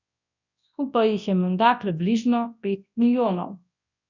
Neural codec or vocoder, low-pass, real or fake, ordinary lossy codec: codec, 24 kHz, 0.9 kbps, WavTokenizer, large speech release; 7.2 kHz; fake; none